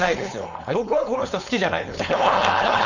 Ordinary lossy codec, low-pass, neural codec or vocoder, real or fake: none; 7.2 kHz; codec, 16 kHz, 4.8 kbps, FACodec; fake